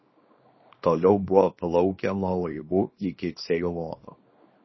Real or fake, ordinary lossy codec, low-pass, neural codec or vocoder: fake; MP3, 24 kbps; 7.2 kHz; codec, 24 kHz, 0.9 kbps, WavTokenizer, small release